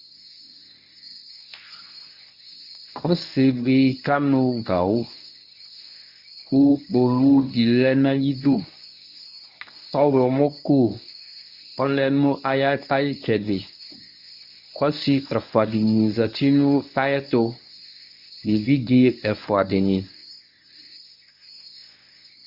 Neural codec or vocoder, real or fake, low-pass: codec, 24 kHz, 0.9 kbps, WavTokenizer, medium speech release version 1; fake; 5.4 kHz